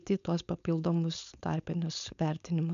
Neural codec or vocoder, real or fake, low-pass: codec, 16 kHz, 4.8 kbps, FACodec; fake; 7.2 kHz